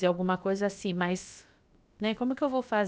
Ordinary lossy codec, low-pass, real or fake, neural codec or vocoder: none; none; fake; codec, 16 kHz, about 1 kbps, DyCAST, with the encoder's durations